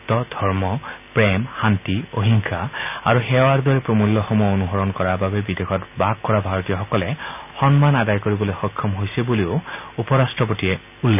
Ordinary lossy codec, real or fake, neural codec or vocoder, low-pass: none; real; none; 3.6 kHz